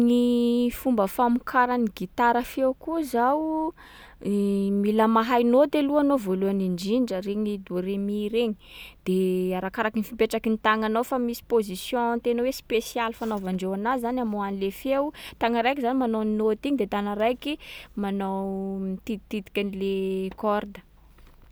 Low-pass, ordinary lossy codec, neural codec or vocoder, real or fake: none; none; none; real